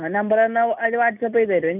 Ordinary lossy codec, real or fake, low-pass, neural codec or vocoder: none; real; 3.6 kHz; none